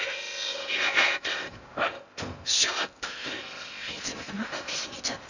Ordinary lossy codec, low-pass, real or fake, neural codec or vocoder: none; 7.2 kHz; fake; codec, 16 kHz in and 24 kHz out, 0.6 kbps, FocalCodec, streaming, 2048 codes